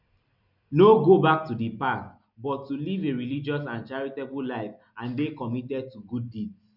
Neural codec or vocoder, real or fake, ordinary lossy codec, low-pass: none; real; none; 5.4 kHz